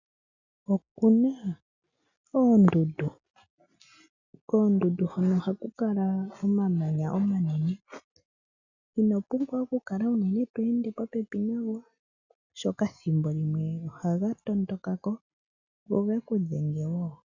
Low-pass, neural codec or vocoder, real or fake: 7.2 kHz; none; real